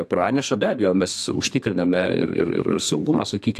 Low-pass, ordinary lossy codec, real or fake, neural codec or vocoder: 14.4 kHz; AAC, 64 kbps; fake; codec, 32 kHz, 1.9 kbps, SNAC